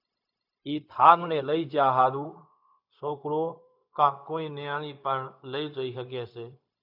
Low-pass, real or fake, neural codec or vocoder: 5.4 kHz; fake; codec, 16 kHz, 0.4 kbps, LongCat-Audio-Codec